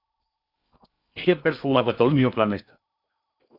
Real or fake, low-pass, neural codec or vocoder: fake; 5.4 kHz; codec, 16 kHz in and 24 kHz out, 0.8 kbps, FocalCodec, streaming, 65536 codes